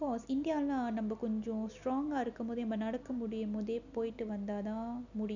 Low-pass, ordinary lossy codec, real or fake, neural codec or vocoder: 7.2 kHz; none; real; none